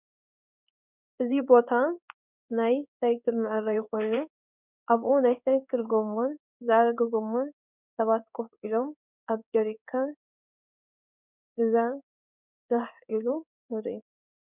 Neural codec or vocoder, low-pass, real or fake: codec, 16 kHz in and 24 kHz out, 1 kbps, XY-Tokenizer; 3.6 kHz; fake